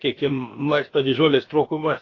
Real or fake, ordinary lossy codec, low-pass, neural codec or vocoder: fake; AAC, 32 kbps; 7.2 kHz; codec, 16 kHz, about 1 kbps, DyCAST, with the encoder's durations